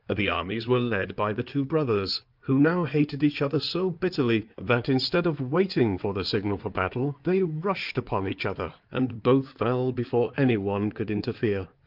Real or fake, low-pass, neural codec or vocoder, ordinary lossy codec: fake; 5.4 kHz; codec, 16 kHz in and 24 kHz out, 2.2 kbps, FireRedTTS-2 codec; Opus, 24 kbps